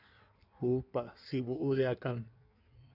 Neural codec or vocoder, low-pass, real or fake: codec, 16 kHz in and 24 kHz out, 1.1 kbps, FireRedTTS-2 codec; 5.4 kHz; fake